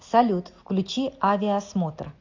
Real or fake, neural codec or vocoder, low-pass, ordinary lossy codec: real; none; 7.2 kHz; AAC, 48 kbps